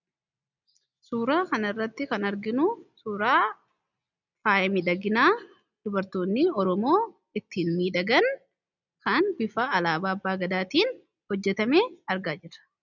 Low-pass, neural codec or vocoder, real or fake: 7.2 kHz; none; real